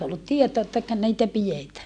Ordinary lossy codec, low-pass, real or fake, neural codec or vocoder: none; 9.9 kHz; real; none